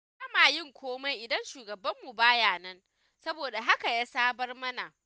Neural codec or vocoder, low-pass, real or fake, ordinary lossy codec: none; none; real; none